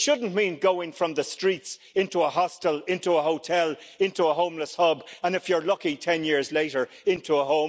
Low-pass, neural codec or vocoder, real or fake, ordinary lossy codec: none; none; real; none